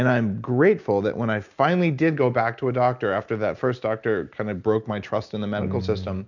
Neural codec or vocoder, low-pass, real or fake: none; 7.2 kHz; real